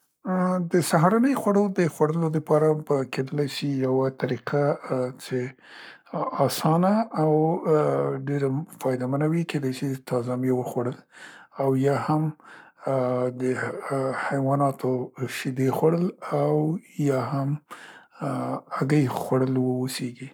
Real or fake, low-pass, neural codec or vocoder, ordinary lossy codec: fake; none; codec, 44.1 kHz, 7.8 kbps, Pupu-Codec; none